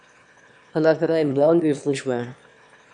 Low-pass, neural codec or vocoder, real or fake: 9.9 kHz; autoencoder, 22.05 kHz, a latent of 192 numbers a frame, VITS, trained on one speaker; fake